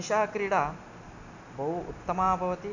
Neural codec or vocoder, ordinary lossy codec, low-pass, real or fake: none; none; 7.2 kHz; real